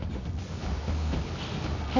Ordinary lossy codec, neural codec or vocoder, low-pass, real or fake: none; codec, 24 kHz, 0.9 kbps, WavTokenizer, medium music audio release; 7.2 kHz; fake